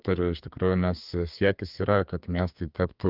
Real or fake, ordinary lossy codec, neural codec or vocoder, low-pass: fake; Opus, 24 kbps; codec, 32 kHz, 1.9 kbps, SNAC; 5.4 kHz